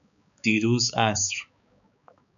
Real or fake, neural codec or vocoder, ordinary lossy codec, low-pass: fake; codec, 16 kHz, 4 kbps, X-Codec, HuBERT features, trained on balanced general audio; MP3, 96 kbps; 7.2 kHz